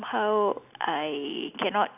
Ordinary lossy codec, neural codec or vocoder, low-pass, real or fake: none; none; 3.6 kHz; real